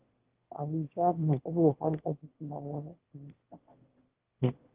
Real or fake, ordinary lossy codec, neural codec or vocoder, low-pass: fake; Opus, 16 kbps; autoencoder, 22.05 kHz, a latent of 192 numbers a frame, VITS, trained on one speaker; 3.6 kHz